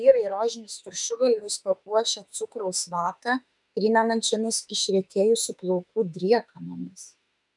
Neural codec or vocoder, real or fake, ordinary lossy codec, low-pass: autoencoder, 48 kHz, 32 numbers a frame, DAC-VAE, trained on Japanese speech; fake; MP3, 96 kbps; 10.8 kHz